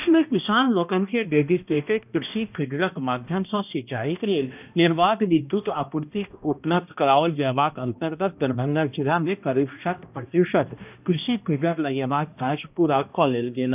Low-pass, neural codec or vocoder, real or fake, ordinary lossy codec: 3.6 kHz; codec, 16 kHz, 1 kbps, X-Codec, HuBERT features, trained on balanced general audio; fake; none